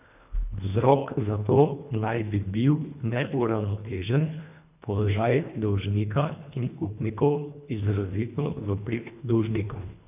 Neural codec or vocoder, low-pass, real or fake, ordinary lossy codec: codec, 24 kHz, 1.5 kbps, HILCodec; 3.6 kHz; fake; none